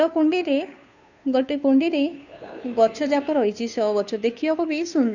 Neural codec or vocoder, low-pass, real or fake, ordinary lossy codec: codec, 16 kHz, 2 kbps, FunCodec, trained on Chinese and English, 25 frames a second; 7.2 kHz; fake; none